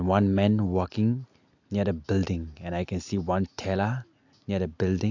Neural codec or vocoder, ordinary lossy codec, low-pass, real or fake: none; AAC, 48 kbps; 7.2 kHz; real